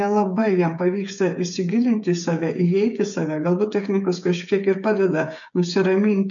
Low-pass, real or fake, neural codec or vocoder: 7.2 kHz; fake; codec, 16 kHz, 8 kbps, FreqCodec, smaller model